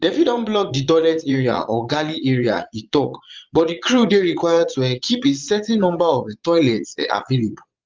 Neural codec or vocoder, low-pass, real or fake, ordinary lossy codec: vocoder, 22.05 kHz, 80 mel bands, Vocos; 7.2 kHz; fake; Opus, 32 kbps